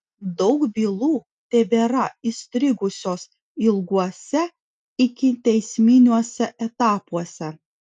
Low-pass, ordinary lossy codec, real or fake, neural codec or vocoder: 10.8 kHz; MP3, 96 kbps; real; none